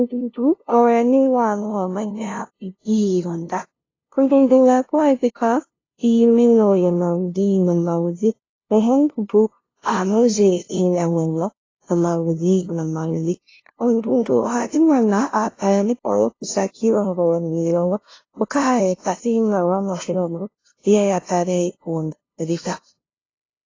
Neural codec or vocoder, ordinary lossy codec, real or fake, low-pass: codec, 16 kHz, 0.5 kbps, FunCodec, trained on LibriTTS, 25 frames a second; AAC, 32 kbps; fake; 7.2 kHz